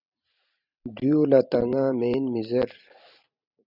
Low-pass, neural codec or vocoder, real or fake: 5.4 kHz; vocoder, 44.1 kHz, 128 mel bands every 512 samples, BigVGAN v2; fake